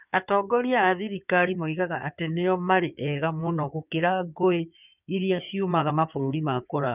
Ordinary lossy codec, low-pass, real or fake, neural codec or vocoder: none; 3.6 kHz; fake; codec, 16 kHz in and 24 kHz out, 2.2 kbps, FireRedTTS-2 codec